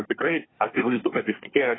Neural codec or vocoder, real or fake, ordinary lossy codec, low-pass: codec, 24 kHz, 1 kbps, SNAC; fake; AAC, 16 kbps; 7.2 kHz